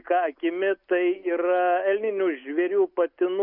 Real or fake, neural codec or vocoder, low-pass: real; none; 5.4 kHz